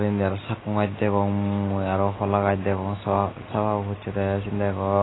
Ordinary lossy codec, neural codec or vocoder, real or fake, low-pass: AAC, 16 kbps; none; real; 7.2 kHz